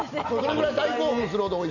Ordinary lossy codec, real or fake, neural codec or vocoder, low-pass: none; real; none; 7.2 kHz